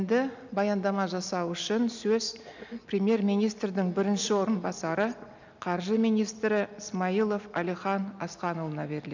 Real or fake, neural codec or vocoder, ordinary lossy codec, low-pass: real; none; none; 7.2 kHz